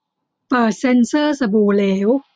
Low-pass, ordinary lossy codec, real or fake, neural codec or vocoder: none; none; real; none